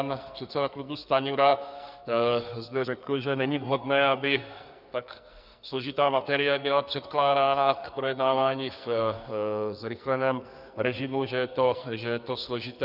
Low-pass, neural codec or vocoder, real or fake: 5.4 kHz; codec, 44.1 kHz, 2.6 kbps, SNAC; fake